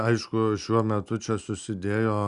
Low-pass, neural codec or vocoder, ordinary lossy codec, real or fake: 10.8 kHz; none; Opus, 64 kbps; real